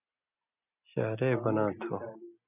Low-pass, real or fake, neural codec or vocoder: 3.6 kHz; real; none